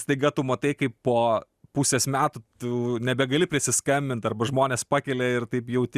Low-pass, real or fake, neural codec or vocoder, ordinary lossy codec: 14.4 kHz; real; none; Opus, 64 kbps